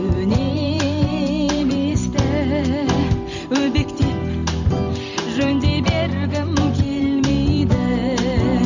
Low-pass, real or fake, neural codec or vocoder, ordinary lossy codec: 7.2 kHz; fake; vocoder, 44.1 kHz, 128 mel bands every 512 samples, BigVGAN v2; none